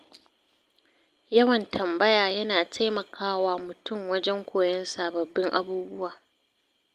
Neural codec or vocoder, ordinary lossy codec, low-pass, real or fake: none; Opus, 32 kbps; 14.4 kHz; real